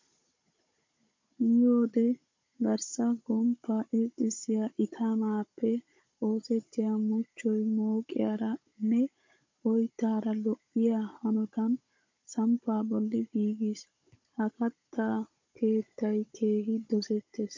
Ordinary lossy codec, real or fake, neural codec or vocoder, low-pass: MP3, 48 kbps; fake; codec, 16 kHz, 16 kbps, FunCodec, trained on Chinese and English, 50 frames a second; 7.2 kHz